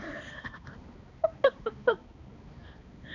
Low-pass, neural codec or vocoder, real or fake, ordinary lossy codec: 7.2 kHz; codec, 16 kHz, 2 kbps, X-Codec, HuBERT features, trained on balanced general audio; fake; none